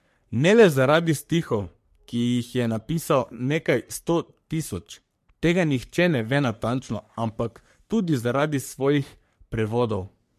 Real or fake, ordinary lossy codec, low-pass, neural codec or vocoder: fake; MP3, 64 kbps; 14.4 kHz; codec, 44.1 kHz, 3.4 kbps, Pupu-Codec